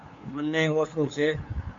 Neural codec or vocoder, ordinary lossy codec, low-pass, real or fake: codec, 16 kHz, 2 kbps, FunCodec, trained on LibriTTS, 25 frames a second; MP3, 48 kbps; 7.2 kHz; fake